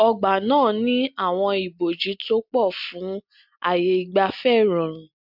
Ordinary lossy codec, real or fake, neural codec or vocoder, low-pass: MP3, 48 kbps; real; none; 5.4 kHz